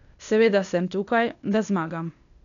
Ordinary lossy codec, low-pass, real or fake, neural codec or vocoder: MP3, 96 kbps; 7.2 kHz; fake; codec, 16 kHz, 0.8 kbps, ZipCodec